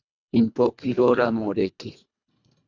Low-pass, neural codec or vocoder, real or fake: 7.2 kHz; codec, 24 kHz, 1.5 kbps, HILCodec; fake